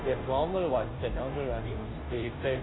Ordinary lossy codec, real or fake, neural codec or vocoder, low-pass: AAC, 16 kbps; fake; codec, 16 kHz, 0.5 kbps, FunCodec, trained on Chinese and English, 25 frames a second; 7.2 kHz